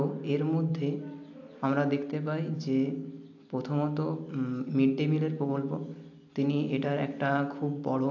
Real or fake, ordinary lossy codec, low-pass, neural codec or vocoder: real; AAC, 48 kbps; 7.2 kHz; none